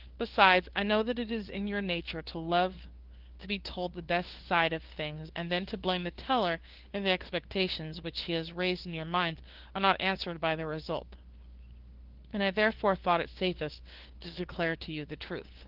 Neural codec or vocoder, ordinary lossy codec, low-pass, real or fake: codec, 16 kHz, 2 kbps, FunCodec, trained on LibriTTS, 25 frames a second; Opus, 16 kbps; 5.4 kHz; fake